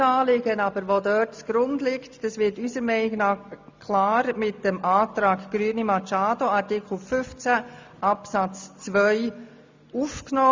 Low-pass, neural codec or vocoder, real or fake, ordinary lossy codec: 7.2 kHz; none; real; MP3, 64 kbps